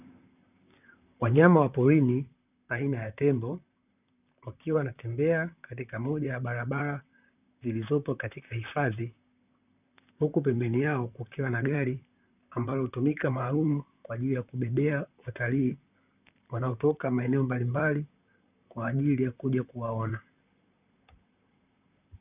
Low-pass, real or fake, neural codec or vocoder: 3.6 kHz; fake; vocoder, 44.1 kHz, 128 mel bands, Pupu-Vocoder